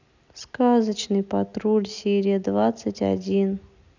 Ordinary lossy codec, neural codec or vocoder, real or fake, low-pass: none; none; real; 7.2 kHz